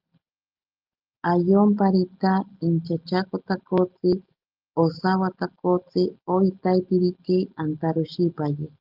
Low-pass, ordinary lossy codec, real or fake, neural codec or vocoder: 5.4 kHz; Opus, 32 kbps; real; none